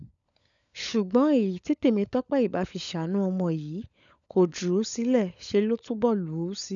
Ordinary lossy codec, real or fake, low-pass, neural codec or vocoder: none; fake; 7.2 kHz; codec, 16 kHz, 16 kbps, FunCodec, trained on LibriTTS, 50 frames a second